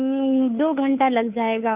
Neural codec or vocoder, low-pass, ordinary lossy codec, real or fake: codec, 16 kHz, 2 kbps, FunCodec, trained on Chinese and English, 25 frames a second; 3.6 kHz; Opus, 64 kbps; fake